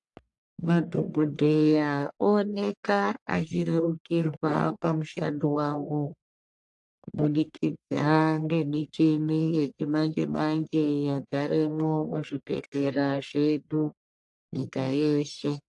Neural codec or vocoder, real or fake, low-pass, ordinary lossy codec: codec, 44.1 kHz, 1.7 kbps, Pupu-Codec; fake; 10.8 kHz; AAC, 64 kbps